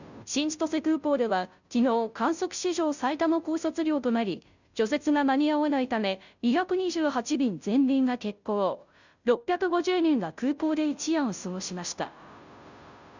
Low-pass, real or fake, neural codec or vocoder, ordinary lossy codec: 7.2 kHz; fake; codec, 16 kHz, 0.5 kbps, FunCodec, trained on Chinese and English, 25 frames a second; none